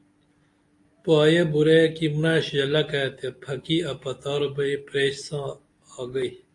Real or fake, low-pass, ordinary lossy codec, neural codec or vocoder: real; 10.8 kHz; AAC, 48 kbps; none